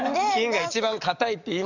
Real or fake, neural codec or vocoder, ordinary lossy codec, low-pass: fake; codec, 44.1 kHz, 7.8 kbps, DAC; none; 7.2 kHz